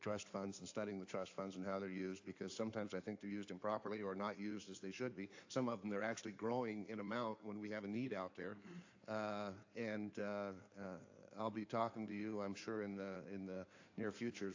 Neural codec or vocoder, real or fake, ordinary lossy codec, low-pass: codec, 16 kHz in and 24 kHz out, 2.2 kbps, FireRedTTS-2 codec; fake; AAC, 48 kbps; 7.2 kHz